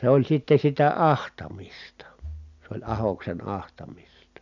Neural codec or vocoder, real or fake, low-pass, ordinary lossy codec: none; real; 7.2 kHz; none